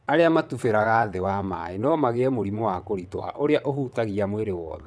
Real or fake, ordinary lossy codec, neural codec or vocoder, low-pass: fake; none; vocoder, 22.05 kHz, 80 mel bands, WaveNeXt; none